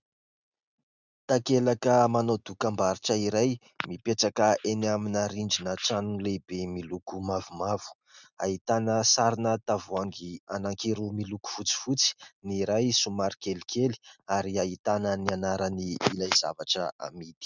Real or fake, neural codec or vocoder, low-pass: real; none; 7.2 kHz